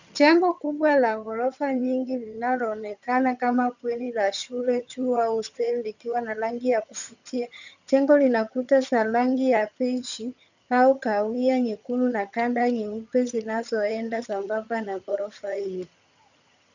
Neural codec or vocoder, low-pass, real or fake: vocoder, 22.05 kHz, 80 mel bands, HiFi-GAN; 7.2 kHz; fake